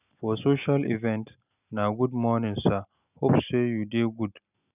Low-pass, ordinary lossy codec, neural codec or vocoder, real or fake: 3.6 kHz; none; none; real